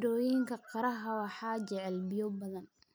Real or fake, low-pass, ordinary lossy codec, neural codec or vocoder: real; none; none; none